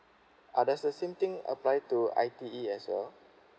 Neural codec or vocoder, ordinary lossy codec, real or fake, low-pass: none; none; real; none